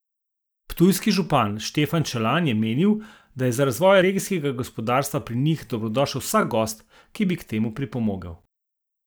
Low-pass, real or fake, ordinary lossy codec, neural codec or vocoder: none; real; none; none